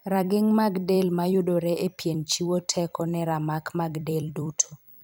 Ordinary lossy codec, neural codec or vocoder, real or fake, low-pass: none; vocoder, 44.1 kHz, 128 mel bands every 256 samples, BigVGAN v2; fake; none